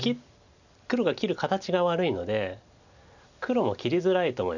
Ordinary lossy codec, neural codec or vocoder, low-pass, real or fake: none; none; 7.2 kHz; real